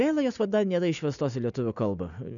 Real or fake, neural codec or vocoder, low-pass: real; none; 7.2 kHz